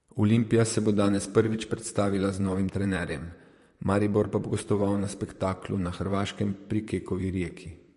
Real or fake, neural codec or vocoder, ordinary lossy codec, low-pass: fake; vocoder, 44.1 kHz, 128 mel bands, Pupu-Vocoder; MP3, 48 kbps; 14.4 kHz